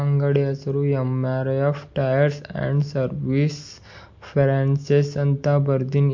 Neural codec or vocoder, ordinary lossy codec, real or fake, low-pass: none; MP3, 48 kbps; real; 7.2 kHz